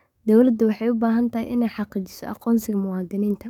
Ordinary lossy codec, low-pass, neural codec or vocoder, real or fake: none; 19.8 kHz; codec, 44.1 kHz, 7.8 kbps, DAC; fake